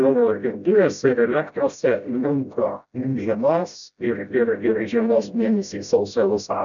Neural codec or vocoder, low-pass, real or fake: codec, 16 kHz, 0.5 kbps, FreqCodec, smaller model; 7.2 kHz; fake